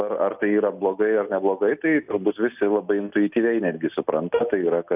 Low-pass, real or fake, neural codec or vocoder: 3.6 kHz; real; none